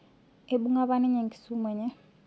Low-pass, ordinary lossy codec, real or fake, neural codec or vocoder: none; none; real; none